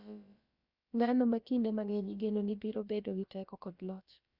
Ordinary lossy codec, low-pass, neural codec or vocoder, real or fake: Opus, 64 kbps; 5.4 kHz; codec, 16 kHz, about 1 kbps, DyCAST, with the encoder's durations; fake